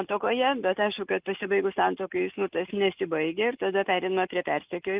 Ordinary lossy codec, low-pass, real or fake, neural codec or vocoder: Opus, 32 kbps; 3.6 kHz; real; none